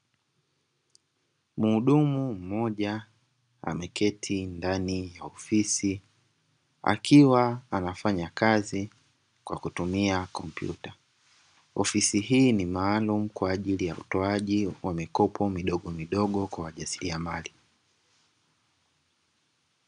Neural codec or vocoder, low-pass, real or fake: none; 9.9 kHz; real